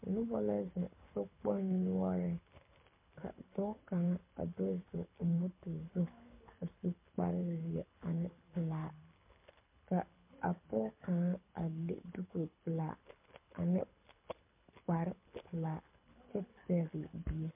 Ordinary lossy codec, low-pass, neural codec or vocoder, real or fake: MP3, 32 kbps; 3.6 kHz; codec, 24 kHz, 6 kbps, HILCodec; fake